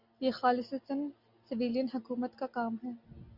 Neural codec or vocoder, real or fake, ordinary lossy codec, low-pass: none; real; Opus, 64 kbps; 5.4 kHz